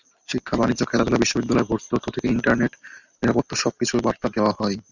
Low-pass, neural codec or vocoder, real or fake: 7.2 kHz; none; real